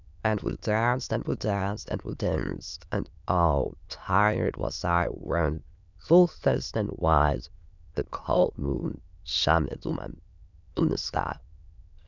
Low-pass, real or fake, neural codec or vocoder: 7.2 kHz; fake; autoencoder, 22.05 kHz, a latent of 192 numbers a frame, VITS, trained on many speakers